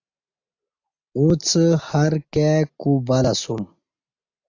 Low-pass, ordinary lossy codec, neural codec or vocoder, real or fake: 7.2 kHz; AAC, 48 kbps; none; real